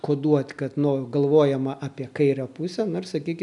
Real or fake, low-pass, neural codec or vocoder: real; 10.8 kHz; none